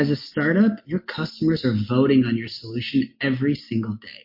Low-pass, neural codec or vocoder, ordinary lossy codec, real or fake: 5.4 kHz; none; MP3, 32 kbps; real